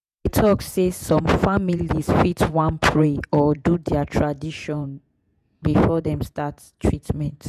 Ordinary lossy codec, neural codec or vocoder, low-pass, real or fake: none; vocoder, 48 kHz, 128 mel bands, Vocos; 14.4 kHz; fake